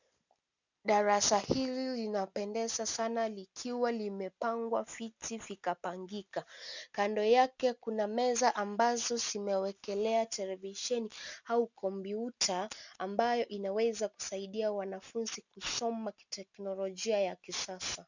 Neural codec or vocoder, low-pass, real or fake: none; 7.2 kHz; real